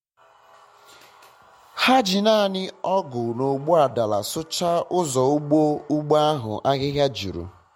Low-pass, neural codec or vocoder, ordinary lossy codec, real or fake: 19.8 kHz; none; MP3, 64 kbps; real